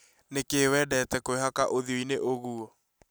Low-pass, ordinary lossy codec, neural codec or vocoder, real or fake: none; none; none; real